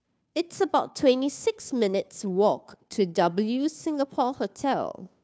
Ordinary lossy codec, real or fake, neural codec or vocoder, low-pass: none; fake; codec, 16 kHz, 2 kbps, FunCodec, trained on Chinese and English, 25 frames a second; none